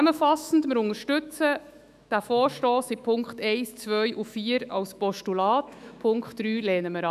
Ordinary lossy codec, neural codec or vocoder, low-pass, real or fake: none; autoencoder, 48 kHz, 128 numbers a frame, DAC-VAE, trained on Japanese speech; 14.4 kHz; fake